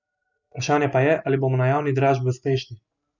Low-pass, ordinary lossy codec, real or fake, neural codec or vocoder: 7.2 kHz; none; real; none